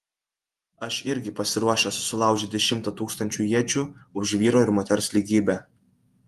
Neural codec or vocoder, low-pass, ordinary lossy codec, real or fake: vocoder, 48 kHz, 128 mel bands, Vocos; 14.4 kHz; Opus, 32 kbps; fake